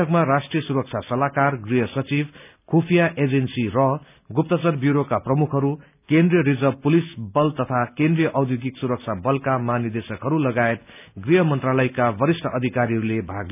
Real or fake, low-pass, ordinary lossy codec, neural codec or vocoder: real; 3.6 kHz; none; none